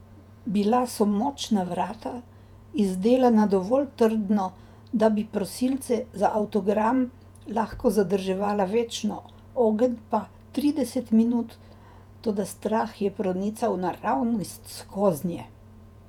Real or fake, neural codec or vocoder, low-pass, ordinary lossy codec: fake; vocoder, 48 kHz, 128 mel bands, Vocos; 19.8 kHz; none